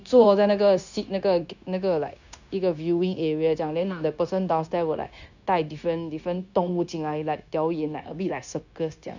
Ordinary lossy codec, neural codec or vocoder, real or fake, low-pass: none; codec, 16 kHz, 0.9 kbps, LongCat-Audio-Codec; fake; 7.2 kHz